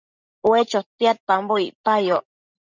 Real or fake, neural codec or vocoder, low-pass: real; none; 7.2 kHz